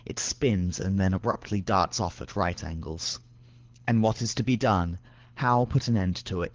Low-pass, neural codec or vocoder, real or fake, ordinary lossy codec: 7.2 kHz; codec, 24 kHz, 6 kbps, HILCodec; fake; Opus, 16 kbps